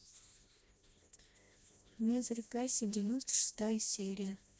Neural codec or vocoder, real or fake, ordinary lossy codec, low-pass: codec, 16 kHz, 1 kbps, FreqCodec, smaller model; fake; none; none